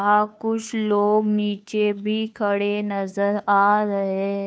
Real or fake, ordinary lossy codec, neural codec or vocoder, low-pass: fake; none; codec, 16 kHz, 2 kbps, FunCodec, trained on Chinese and English, 25 frames a second; none